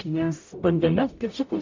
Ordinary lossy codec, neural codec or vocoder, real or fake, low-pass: MP3, 64 kbps; codec, 44.1 kHz, 0.9 kbps, DAC; fake; 7.2 kHz